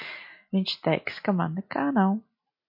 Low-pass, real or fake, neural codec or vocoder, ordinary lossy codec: 5.4 kHz; real; none; MP3, 32 kbps